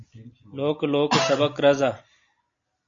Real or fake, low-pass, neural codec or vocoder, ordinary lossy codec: real; 7.2 kHz; none; MP3, 48 kbps